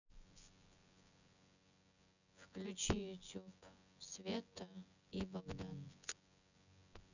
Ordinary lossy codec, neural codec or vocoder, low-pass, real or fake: none; vocoder, 24 kHz, 100 mel bands, Vocos; 7.2 kHz; fake